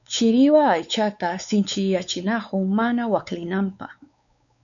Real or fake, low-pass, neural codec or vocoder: fake; 7.2 kHz; codec, 16 kHz, 4 kbps, X-Codec, WavLM features, trained on Multilingual LibriSpeech